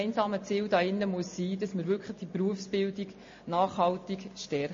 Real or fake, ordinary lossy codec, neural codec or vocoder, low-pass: real; MP3, 32 kbps; none; 7.2 kHz